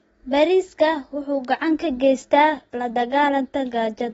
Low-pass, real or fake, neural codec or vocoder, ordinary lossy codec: 14.4 kHz; real; none; AAC, 24 kbps